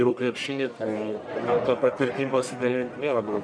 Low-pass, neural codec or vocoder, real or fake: 9.9 kHz; codec, 44.1 kHz, 1.7 kbps, Pupu-Codec; fake